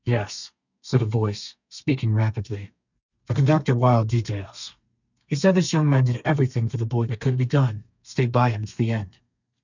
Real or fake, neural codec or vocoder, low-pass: fake; codec, 32 kHz, 1.9 kbps, SNAC; 7.2 kHz